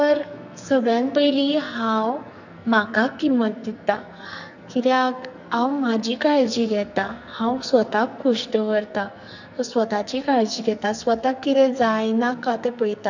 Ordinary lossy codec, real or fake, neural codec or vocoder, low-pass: none; fake; codec, 44.1 kHz, 2.6 kbps, SNAC; 7.2 kHz